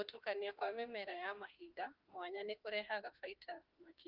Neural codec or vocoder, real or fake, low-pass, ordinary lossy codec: autoencoder, 48 kHz, 32 numbers a frame, DAC-VAE, trained on Japanese speech; fake; 5.4 kHz; Opus, 32 kbps